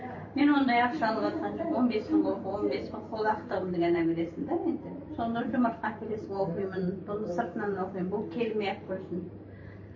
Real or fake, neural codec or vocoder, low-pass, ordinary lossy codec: fake; vocoder, 44.1 kHz, 128 mel bands every 512 samples, BigVGAN v2; 7.2 kHz; MP3, 32 kbps